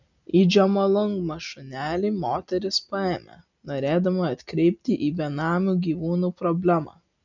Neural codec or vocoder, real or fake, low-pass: none; real; 7.2 kHz